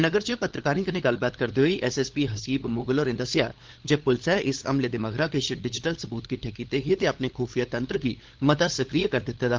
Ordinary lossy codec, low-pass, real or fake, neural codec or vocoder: Opus, 16 kbps; 7.2 kHz; fake; codec, 16 kHz, 8 kbps, FunCodec, trained on LibriTTS, 25 frames a second